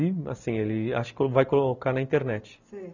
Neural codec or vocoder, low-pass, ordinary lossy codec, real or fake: none; 7.2 kHz; none; real